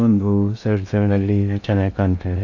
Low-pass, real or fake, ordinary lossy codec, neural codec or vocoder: 7.2 kHz; fake; none; codec, 16 kHz in and 24 kHz out, 0.6 kbps, FocalCodec, streaming, 2048 codes